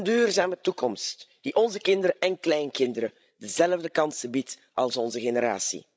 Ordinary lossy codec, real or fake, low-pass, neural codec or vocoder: none; fake; none; codec, 16 kHz, 16 kbps, FreqCodec, larger model